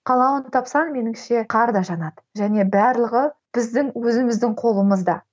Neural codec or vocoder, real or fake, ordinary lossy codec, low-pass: none; real; none; none